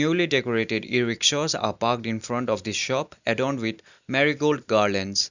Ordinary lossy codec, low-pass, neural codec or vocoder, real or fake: none; 7.2 kHz; none; real